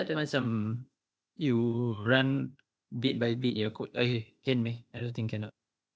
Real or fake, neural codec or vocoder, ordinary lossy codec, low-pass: fake; codec, 16 kHz, 0.8 kbps, ZipCodec; none; none